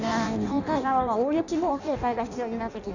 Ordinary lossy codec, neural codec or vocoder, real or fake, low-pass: none; codec, 16 kHz in and 24 kHz out, 0.6 kbps, FireRedTTS-2 codec; fake; 7.2 kHz